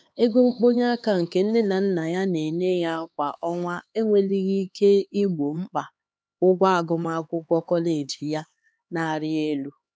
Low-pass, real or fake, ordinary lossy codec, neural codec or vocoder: none; fake; none; codec, 16 kHz, 4 kbps, X-Codec, HuBERT features, trained on LibriSpeech